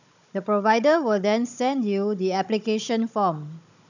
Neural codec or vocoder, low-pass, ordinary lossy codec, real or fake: codec, 16 kHz, 16 kbps, FunCodec, trained on Chinese and English, 50 frames a second; 7.2 kHz; none; fake